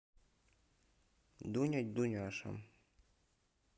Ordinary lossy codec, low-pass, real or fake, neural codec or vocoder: none; none; real; none